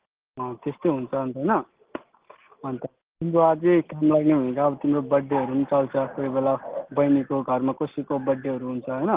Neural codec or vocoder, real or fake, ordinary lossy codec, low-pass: none; real; Opus, 16 kbps; 3.6 kHz